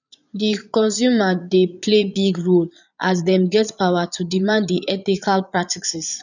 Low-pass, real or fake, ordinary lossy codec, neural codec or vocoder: 7.2 kHz; fake; none; vocoder, 22.05 kHz, 80 mel bands, Vocos